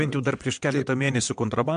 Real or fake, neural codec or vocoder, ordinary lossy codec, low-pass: fake; vocoder, 22.05 kHz, 80 mel bands, WaveNeXt; MP3, 64 kbps; 9.9 kHz